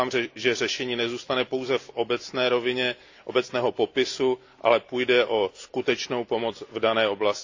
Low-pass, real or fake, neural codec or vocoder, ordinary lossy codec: 7.2 kHz; real; none; AAC, 48 kbps